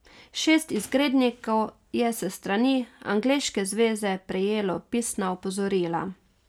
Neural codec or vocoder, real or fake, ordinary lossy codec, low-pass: none; real; none; 19.8 kHz